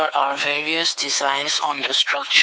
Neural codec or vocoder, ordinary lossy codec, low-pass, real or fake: codec, 16 kHz, 4 kbps, X-Codec, WavLM features, trained on Multilingual LibriSpeech; none; none; fake